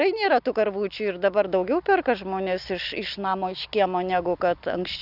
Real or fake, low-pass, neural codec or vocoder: real; 5.4 kHz; none